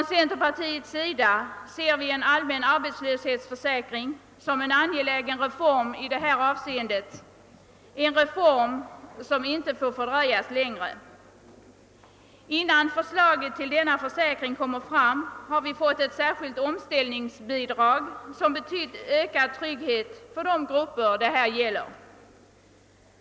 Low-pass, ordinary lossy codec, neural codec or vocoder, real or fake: none; none; none; real